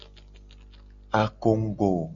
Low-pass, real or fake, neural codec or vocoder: 7.2 kHz; real; none